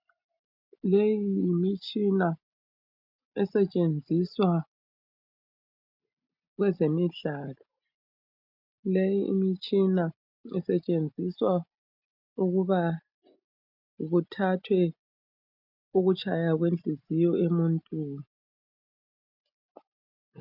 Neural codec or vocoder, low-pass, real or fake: none; 5.4 kHz; real